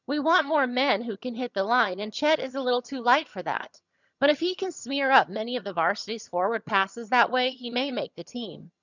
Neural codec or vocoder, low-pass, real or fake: vocoder, 22.05 kHz, 80 mel bands, HiFi-GAN; 7.2 kHz; fake